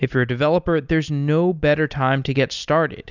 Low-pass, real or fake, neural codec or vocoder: 7.2 kHz; real; none